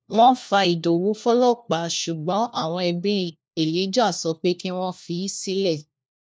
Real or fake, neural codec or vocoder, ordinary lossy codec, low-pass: fake; codec, 16 kHz, 1 kbps, FunCodec, trained on LibriTTS, 50 frames a second; none; none